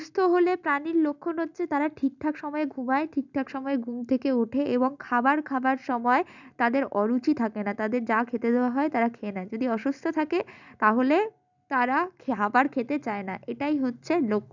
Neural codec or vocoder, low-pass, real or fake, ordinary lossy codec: none; 7.2 kHz; real; none